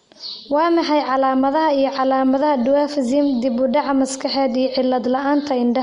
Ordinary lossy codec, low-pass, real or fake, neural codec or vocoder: MP3, 64 kbps; 10.8 kHz; real; none